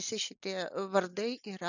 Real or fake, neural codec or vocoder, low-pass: real; none; 7.2 kHz